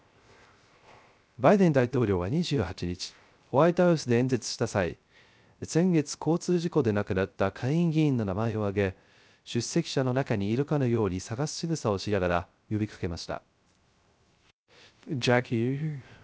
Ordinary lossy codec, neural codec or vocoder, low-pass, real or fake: none; codec, 16 kHz, 0.3 kbps, FocalCodec; none; fake